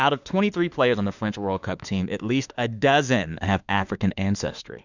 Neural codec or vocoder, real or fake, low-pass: autoencoder, 48 kHz, 32 numbers a frame, DAC-VAE, trained on Japanese speech; fake; 7.2 kHz